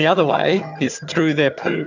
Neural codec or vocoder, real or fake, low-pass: vocoder, 22.05 kHz, 80 mel bands, HiFi-GAN; fake; 7.2 kHz